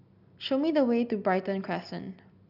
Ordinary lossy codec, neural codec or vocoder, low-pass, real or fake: none; none; 5.4 kHz; real